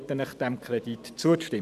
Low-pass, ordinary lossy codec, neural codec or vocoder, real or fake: 14.4 kHz; none; vocoder, 44.1 kHz, 128 mel bands every 512 samples, BigVGAN v2; fake